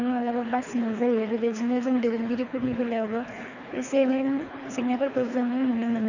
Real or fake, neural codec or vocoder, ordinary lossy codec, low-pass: fake; codec, 24 kHz, 3 kbps, HILCodec; none; 7.2 kHz